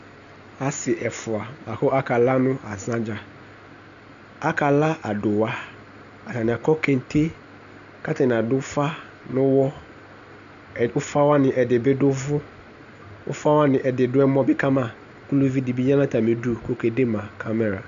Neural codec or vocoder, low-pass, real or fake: none; 7.2 kHz; real